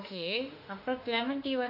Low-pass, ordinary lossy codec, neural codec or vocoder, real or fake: 5.4 kHz; none; autoencoder, 48 kHz, 32 numbers a frame, DAC-VAE, trained on Japanese speech; fake